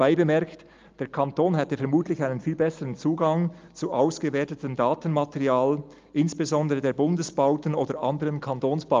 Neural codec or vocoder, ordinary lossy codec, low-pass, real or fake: none; Opus, 32 kbps; 7.2 kHz; real